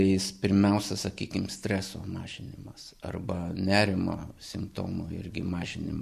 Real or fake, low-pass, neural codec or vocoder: real; 14.4 kHz; none